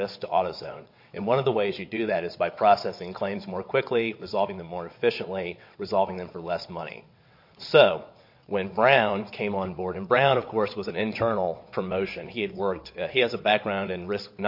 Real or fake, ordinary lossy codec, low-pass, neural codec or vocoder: fake; MP3, 32 kbps; 5.4 kHz; vocoder, 22.05 kHz, 80 mel bands, WaveNeXt